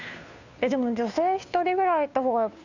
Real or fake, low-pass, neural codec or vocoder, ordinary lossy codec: fake; 7.2 kHz; codec, 16 kHz, 2 kbps, FunCodec, trained on Chinese and English, 25 frames a second; none